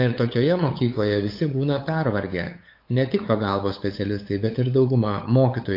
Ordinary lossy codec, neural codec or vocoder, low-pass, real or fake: AAC, 32 kbps; codec, 16 kHz, 8 kbps, FunCodec, trained on LibriTTS, 25 frames a second; 5.4 kHz; fake